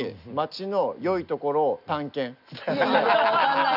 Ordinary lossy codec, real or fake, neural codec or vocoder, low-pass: none; real; none; 5.4 kHz